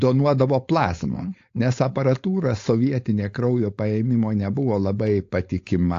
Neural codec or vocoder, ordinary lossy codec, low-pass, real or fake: codec, 16 kHz, 4.8 kbps, FACodec; AAC, 48 kbps; 7.2 kHz; fake